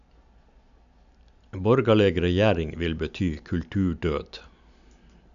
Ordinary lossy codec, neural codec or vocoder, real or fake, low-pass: none; none; real; 7.2 kHz